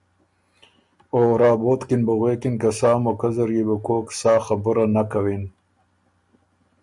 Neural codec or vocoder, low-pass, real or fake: none; 10.8 kHz; real